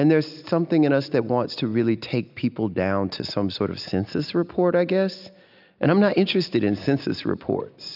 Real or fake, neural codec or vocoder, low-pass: real; none; 5.4 kHz